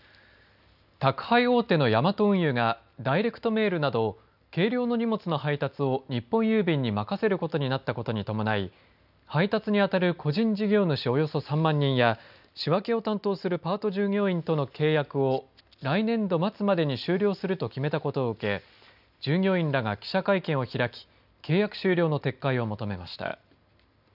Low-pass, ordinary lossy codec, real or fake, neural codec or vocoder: 5.4 kHz; none; real; none